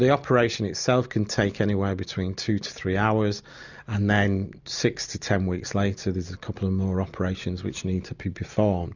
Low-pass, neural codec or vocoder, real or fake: 7.2 kHz; none; real